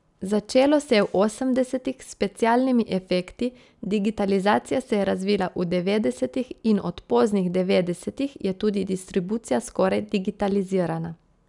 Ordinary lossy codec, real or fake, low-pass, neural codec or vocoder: none; real; 10.8 kHz; none